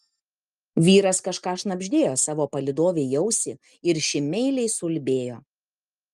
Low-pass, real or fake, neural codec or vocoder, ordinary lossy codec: 14.4 kHz; real; none; Opus, 32 kbps